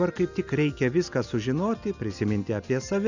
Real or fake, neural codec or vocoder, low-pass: real; none; 7.2 kHz